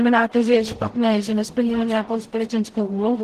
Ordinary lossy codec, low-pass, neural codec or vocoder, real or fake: Opus, 16 kbps; 14.4 kHz; codec, 44.1 kHz, 0.9 kbps, DAC; fake